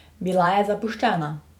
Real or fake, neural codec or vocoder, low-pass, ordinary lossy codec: fake; vocoder, 44.1 kHz, 128 mel bands every 512 samples, BigVGAN v2; 19.8 kHz; none